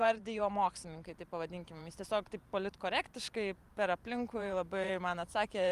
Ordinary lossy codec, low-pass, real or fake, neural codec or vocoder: Opus, 32 kbps; 14.4 kHz; fake; vocoder, 44.1 kHz, 128 mel bands every 512 samples, BigVGAN v2